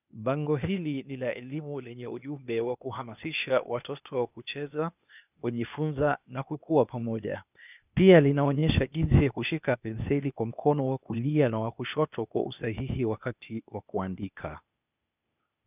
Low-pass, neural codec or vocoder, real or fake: 3.6 kHz; codec, 16 kHz, 0.8 kbps, ZipCodec; fake